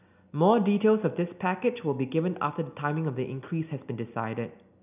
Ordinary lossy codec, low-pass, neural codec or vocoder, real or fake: none; 3.6 kHz; none; real